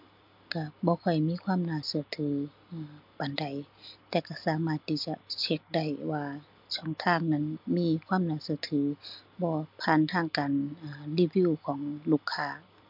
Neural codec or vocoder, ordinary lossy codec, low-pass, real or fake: none; MP3, 48 kbps; 5.4 kHz; real